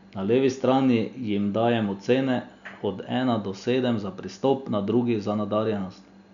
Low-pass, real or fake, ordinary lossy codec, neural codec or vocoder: 7.2 kHz; real; none; none